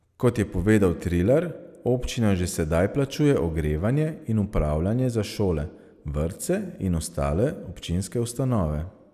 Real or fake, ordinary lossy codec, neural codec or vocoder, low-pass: real; none; none; 14.4 kHz